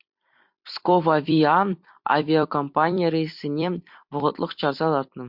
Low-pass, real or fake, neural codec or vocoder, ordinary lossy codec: 5.4 kHz; fake; vocoder, 44.1 kHz, 128 mel bands every 256 samples, BigVGAN v2; MP3, 48 kbps